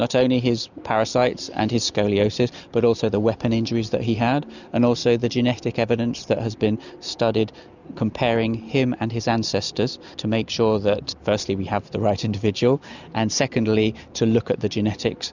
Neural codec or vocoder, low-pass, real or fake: none; 7.2 kHz; real